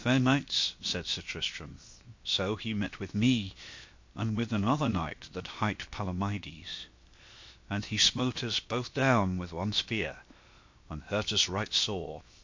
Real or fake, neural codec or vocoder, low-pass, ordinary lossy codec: fake; codec, 16 kHz, 0.7 kbps, FocalCodec; 7.2 kHz; MP3, 48 kbps